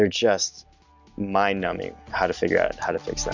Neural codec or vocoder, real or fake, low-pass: none; real; 7.2 kHz